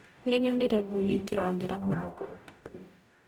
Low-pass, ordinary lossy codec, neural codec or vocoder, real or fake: 19.8 kHz; none; codec, 44.1 kHz, 0.9 kbps, DAC; fake